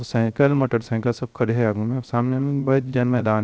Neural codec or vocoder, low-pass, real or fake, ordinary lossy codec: codec, 16 kHz, 0.3 kbps, FocalCodec; none; fake; none